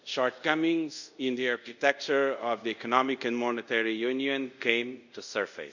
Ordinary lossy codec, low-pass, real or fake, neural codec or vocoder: none; 7.2 kHz; fake; codec, 24 kHz, 0.5 kbps, DualCodec